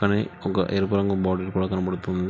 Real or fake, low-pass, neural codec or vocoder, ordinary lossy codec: real; none; none; none